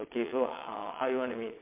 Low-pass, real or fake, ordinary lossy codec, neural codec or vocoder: 3.6 kHz; fake; MP3, 32 kbps; vocoder, 22.05 kHz, 80 mel bands, WaveNeXt